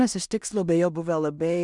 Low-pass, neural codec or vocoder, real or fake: 10.8 kHz; codec, 16 kHz in and 24 kHz out, 0.4 kbps, LongCat-Audio-Codec, two codebook decoder; fake